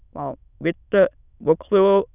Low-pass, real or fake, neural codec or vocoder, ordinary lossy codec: 3.6 kHz; fake; autoencoder, 22.05 kHz, a latent of 192 numbers a frame, VITS, trained on many speakers; none